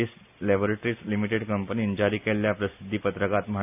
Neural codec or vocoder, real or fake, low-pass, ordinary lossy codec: none; real; 3.6 kHz; none